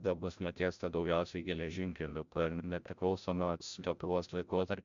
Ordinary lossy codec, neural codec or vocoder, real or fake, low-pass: MP3, 64 kbps; codec, 16 kHz, 0.5 kbps, FreqCodec, larger model; fake; 7.2 kHz